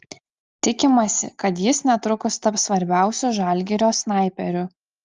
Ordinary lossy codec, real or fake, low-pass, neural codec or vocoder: Opus, 32 kbps; real; 7.2 kHz; none